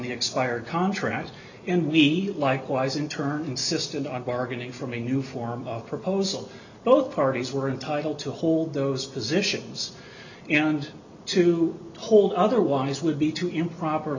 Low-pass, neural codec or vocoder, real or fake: 7.2 kHz; none; real